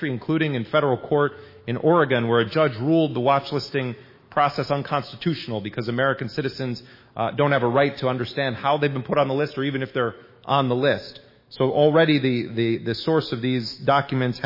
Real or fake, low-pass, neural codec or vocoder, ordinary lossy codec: fake; 5.4 kHz; autoencoder, 48 kHz, 128 numbers a frame, DAC-VAE, trained on Japanese speech; MP3, 24 kbps